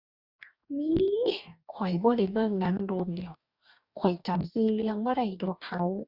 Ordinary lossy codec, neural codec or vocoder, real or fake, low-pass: Opus, 64 kbps; codec, 44.1 kHz, 2.6 kbps, DAC; fake; 5.4 kHz